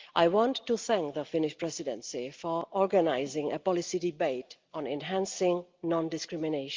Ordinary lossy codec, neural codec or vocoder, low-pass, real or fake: Opus, 32 kbps; none; 7.2 kHz; real